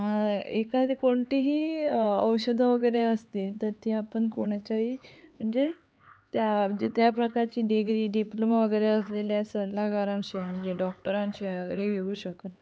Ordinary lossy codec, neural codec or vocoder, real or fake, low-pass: none; codec, 16 kHz, 4 kbps, X-Codec, HuBERT features, trained on LibriSpeech; fake; none